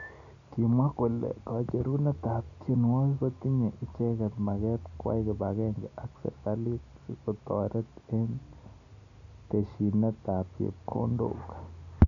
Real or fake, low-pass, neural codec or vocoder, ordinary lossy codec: real; 7.2 kHz; none; none